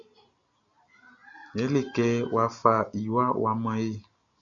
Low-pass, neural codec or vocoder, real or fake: 7.2 kHz; none; real